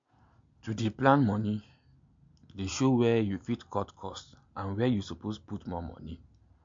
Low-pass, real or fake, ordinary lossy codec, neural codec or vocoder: 7.2 kHz; real; MP3, 48 kbps; none